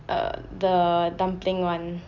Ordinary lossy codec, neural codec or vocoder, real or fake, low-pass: none; none; real; 7.2 kHz